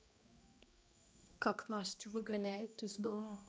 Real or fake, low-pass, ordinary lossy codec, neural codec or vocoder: fake; none; none; codec, 16 kHz, 1 kbps, X-Codec, HuBERT features, trained on balanced general audio